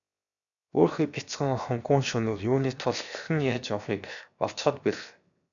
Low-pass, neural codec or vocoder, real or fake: 7.2 kHz; codec, 16 kHz, 0.7 kbps, FocalCodec; fake